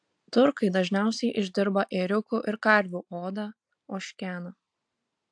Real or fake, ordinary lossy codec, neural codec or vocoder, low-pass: real; AAC, 64 kbps; none; 9.9 kHz